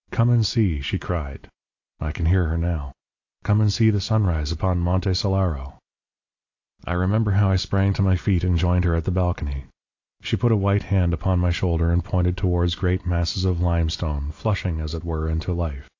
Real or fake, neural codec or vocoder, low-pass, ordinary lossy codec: real; none; 7.2 kHz; AAC, 48 kbps